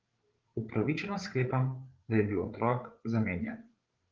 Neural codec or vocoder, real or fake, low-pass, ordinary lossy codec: codec, 16 kHz, 8 kbps, FreqCodec, larger model; fake; 7.2 kHz; Opus, 16 kbps